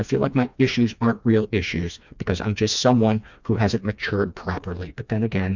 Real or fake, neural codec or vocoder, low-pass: fake; codec, 16 kHz, 2 kbps, FreqCodec, smaller model; 7.2 kHz